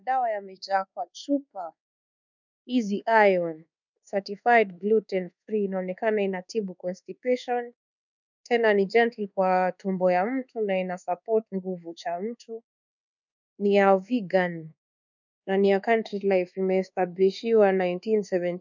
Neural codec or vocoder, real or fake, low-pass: codec, 24 kHz, 1.2 kbps, DualCodec; fake; 7.2 kHz